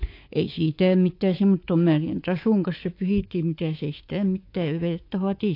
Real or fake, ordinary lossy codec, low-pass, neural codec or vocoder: fake; AAC, 32 kbps; 5.4 kHz; codec, 24 kHz, 3.1 kbps, DualCodec